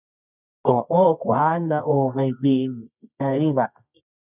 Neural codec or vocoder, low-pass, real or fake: codec, 24 kHz, 0.9 kbps, WavTokenizer, medium music audio release; 3.6 kHz; fake